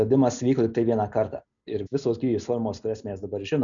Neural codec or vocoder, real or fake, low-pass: none; real; 7.2 kHz